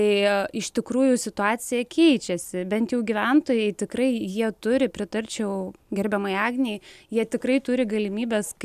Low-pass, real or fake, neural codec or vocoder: 14.4 kHz; real; none